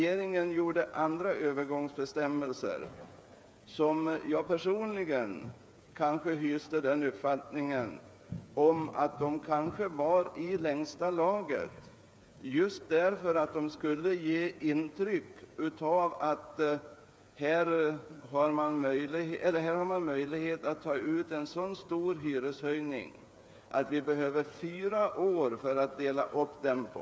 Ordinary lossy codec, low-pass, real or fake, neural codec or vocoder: none; none; fake; codec, 16 kHz, 8 kbps, FreqCodec, smaller model